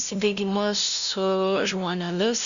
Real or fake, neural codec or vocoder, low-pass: fake; codec, 16 kHz, 0.5 kbps, FunCodec, trained on LibriTTS, 25 frames a second; 7.2 kHz